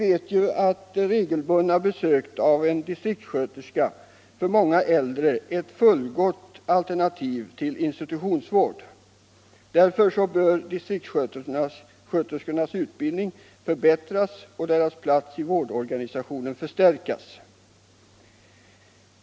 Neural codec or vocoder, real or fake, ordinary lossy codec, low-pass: none; real; none; none